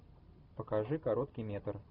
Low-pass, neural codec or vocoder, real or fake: 5.4 kHz; none; real